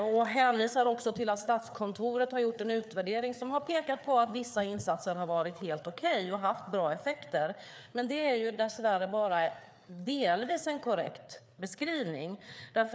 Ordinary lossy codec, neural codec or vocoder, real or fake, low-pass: none; codec, 16 kHz, 4 kbps, FreqCodec, larger model; fake; none